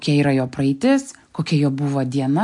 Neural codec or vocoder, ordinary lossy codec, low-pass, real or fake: none; AAC, 64 kbps; 10.8 kHz; real